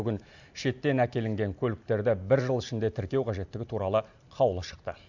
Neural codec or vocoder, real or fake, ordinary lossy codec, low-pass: none; real; none; 7.2 kHz